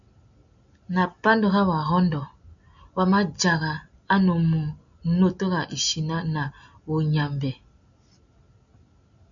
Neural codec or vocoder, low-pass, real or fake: none; 7.2 kHz; real